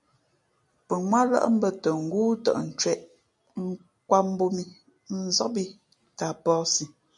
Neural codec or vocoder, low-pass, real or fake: none; 10.8 kHz; real